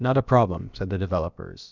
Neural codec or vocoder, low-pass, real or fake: codec, 16 kHz, about 1 kbps, DyCAST, with the encoder's durations; 7.2 kHz; fake